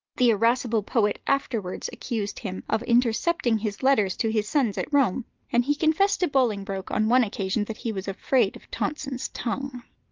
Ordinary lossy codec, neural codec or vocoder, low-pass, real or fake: Opus, 32 kbps; none; 7.2 kHz; real